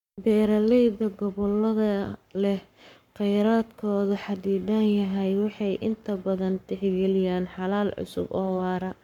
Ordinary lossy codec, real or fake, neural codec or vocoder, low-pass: none; fake; codec, 44.1 kHz, 7.8 kbps, Pupu-Codec; 19.8 kHz